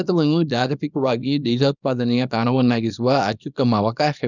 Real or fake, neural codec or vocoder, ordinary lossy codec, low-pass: fake; codec, 24 kHz, 0.9 kbps, WavTokenizer, small release; none; 7.2 kHz